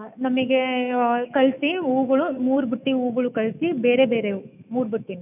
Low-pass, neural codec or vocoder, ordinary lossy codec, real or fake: 3.6 kHz; autoencoder, 48 kHz, 128 numbers a frame, DAC-VAE, trained on Japanese speech; none; fake